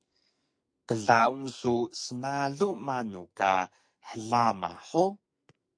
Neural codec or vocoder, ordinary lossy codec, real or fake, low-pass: codec, 44.1 kHz, 2.6 kbps, SNAC; MP3, 48 kbps; fake; 9.9 kHz